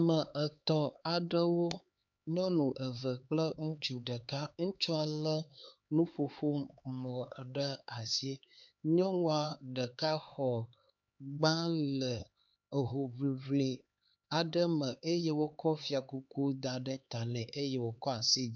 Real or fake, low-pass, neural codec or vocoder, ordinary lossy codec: fake; 7.2 kHz; codec, 16 kHz, 4 kbps, X-Codec, HuBERT features, trained on LibriSpeech; MP3, 64 kbps